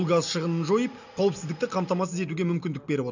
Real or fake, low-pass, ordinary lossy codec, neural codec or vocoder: real; 7.2 kHz; none; none